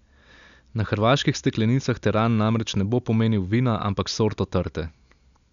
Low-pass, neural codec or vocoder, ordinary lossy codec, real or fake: 7.2 kHz; none; none; real